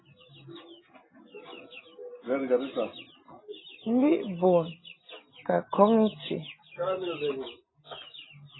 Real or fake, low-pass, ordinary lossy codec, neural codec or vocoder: real; 7.2 kHz; AAC, 16 kbps; none